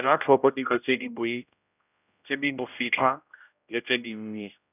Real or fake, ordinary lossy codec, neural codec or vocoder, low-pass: fake; none; codec, 16 kHz, 0.5 kbps, X-Codec, HuBERT features, trained on general audio; 3.6 kHz